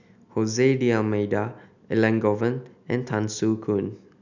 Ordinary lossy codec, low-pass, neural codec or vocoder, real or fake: none; 7.2 kHz; none; real